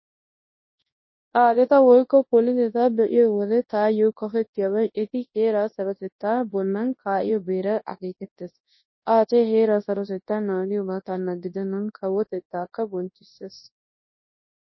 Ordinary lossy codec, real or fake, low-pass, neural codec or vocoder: MP3, 24 kbps; fake; 7.2 kHz; codec, 24 kHz, 0.9 kbps, WavTokenizer, large speech release